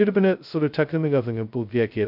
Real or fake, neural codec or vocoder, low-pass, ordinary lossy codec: fake; codec, 16 kHz, 0.2 kbps, FocalCodec; 5.4 kHz; none